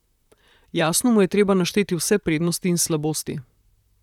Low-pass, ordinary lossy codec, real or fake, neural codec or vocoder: 19.8 kHz; none; fake; vocoder, 44.1 kHz, 128 mel bands, Pupu-Vocoder